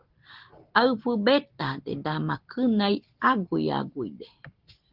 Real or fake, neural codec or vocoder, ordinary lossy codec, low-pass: real; none; Opus, 16 kbps; 5.4 kHz